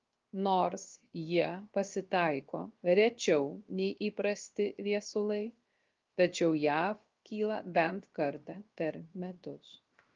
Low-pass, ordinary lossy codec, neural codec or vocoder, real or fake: 7.2 kHz; Opus, 32 kbps; codec, 16 kHz, 0.7 kbps, FocalCodec; fake